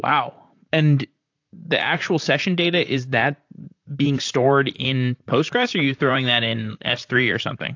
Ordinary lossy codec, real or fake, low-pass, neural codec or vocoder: AAC, 48 kbps; fake; 7.2 kHz; vocoder, 44.1 kHz, 128 mel bands, Pupu-Vocoder